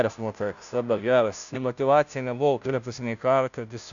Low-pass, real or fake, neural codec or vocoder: 7.2 kHz; fake; codec, 16 kHz, 0.5 kbps, FunCodec, trained on Chinese and English, 25 frames a second